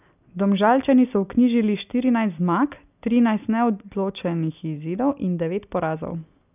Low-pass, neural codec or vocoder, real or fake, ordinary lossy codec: 3.6 kHz; none; real; none